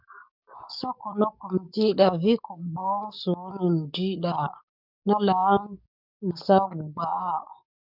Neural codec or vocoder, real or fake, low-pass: codec, 44.1 kHz, 7.8 kbps, DAC; fake; 5.4 kHz